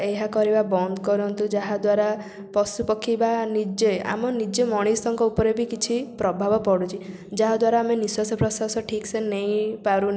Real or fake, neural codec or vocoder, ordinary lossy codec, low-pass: real; none; none; none